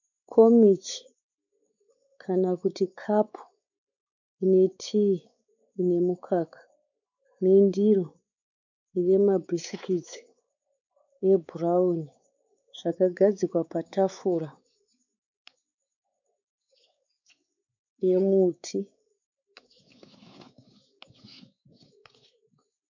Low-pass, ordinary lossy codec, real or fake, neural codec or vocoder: 7.2 kHz; MP3, 64 kbps; fake; codec, 16 kHz, 4 kbps, X-Codec, WavLM features, trained on Multilingual LibriSpeech